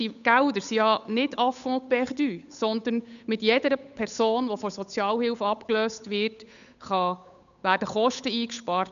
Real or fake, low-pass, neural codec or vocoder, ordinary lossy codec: fake; 7.2 kHz; codec, 16 kHz, 8 kbps, FunCodec, trained on Chinese and English, 25 frames a second; none